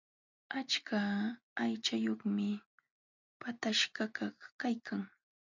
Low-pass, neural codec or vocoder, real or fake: 7.2 kHz; none; real